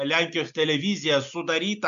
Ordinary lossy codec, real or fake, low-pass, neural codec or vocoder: AAC, 96 kbps; real; 7.2 kHz; none